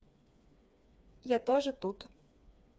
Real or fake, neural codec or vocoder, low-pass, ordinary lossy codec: fake; codec, 16 kHz, 4 kbps, FreqCodec, smaller model; none; none